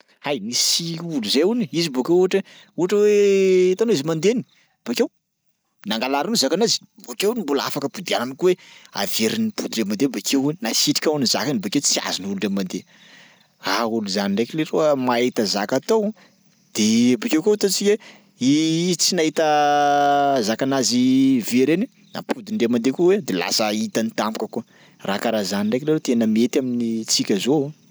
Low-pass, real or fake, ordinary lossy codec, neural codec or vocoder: none; real; none; none